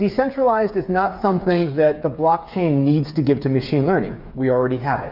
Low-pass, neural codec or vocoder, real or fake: 5.4 kHz; codec, 16 kHz, 8 kbps, FreqCodec, smaller model; fake